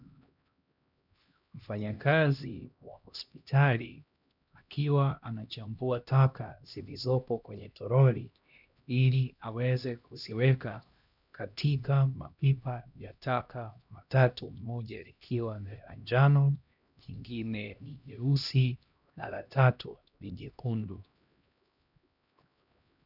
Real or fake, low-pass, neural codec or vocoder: fake; 5.4 kHz; codec, 16 kHz, 1 kbps, X-Codec, HuBERT features, trained on LibriSpeech